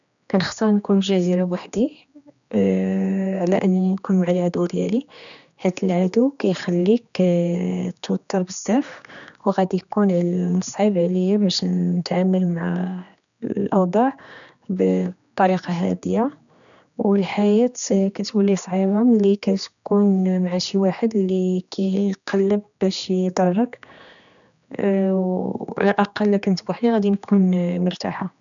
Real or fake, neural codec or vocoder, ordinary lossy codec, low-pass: fake; codec, 16 kHz, 2 kbps, X-Codec, HuBERT features, trained on general audio; none; 7.2 kHz